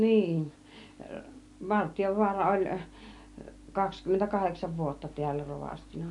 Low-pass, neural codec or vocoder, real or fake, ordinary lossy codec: 10.8 kHz; none; real; none